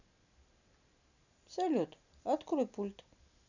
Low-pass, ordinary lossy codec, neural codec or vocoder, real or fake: 7.2 kHz; none; none; real